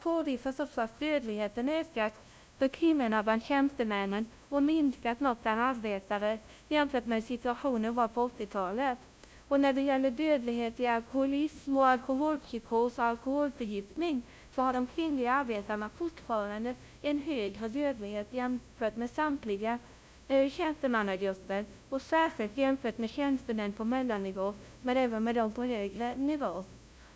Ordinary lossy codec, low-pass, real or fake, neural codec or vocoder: none; none; fake; codec, 16 kHz, 0.5 kbps, FunCodec, trained on LibriTTS, 25 frames a second